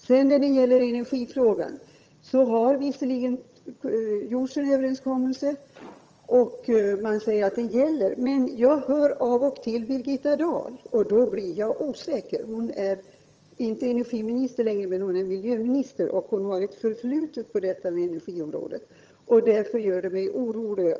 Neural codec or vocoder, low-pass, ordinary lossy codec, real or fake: vocoder, 22.05 kHz, 80 mel bands, HiFi-GAN; 7.2 kHz; Opus, 24 kbps; fake